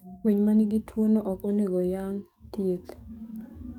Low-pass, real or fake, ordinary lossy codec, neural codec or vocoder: 19.8 kHz; fake; none; codec, 44.1 kHz, 7.8 kbps, DAC